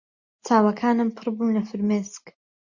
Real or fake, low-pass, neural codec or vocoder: real; 7.2 kHz; none